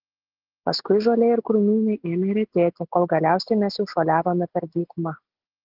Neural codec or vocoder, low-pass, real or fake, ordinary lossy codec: none; 5.4 kHz; real; Opus, 16 kbps